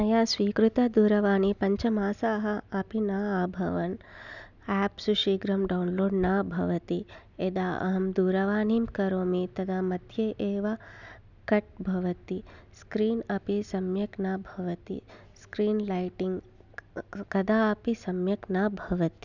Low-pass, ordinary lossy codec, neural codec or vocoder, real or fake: 7.2 kHz; none; none; real